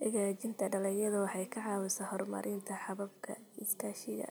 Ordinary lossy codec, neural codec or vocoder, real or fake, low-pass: none; none; real; none